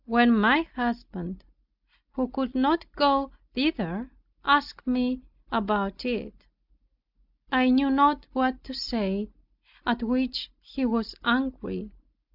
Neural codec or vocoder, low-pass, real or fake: none; 5.4 kHz; real